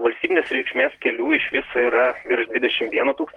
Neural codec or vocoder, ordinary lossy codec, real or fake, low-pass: vocoder, 44.1 kHz, 128 mel bands, Pupu-Vocoder; Opus, 24 kbps; fake; 19.8 kHz